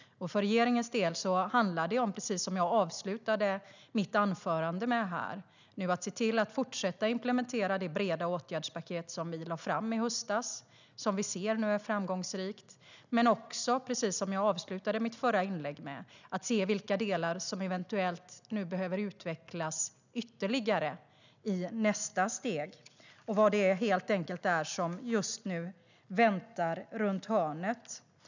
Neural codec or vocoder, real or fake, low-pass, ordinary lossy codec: none; real; 7.2 kHz; none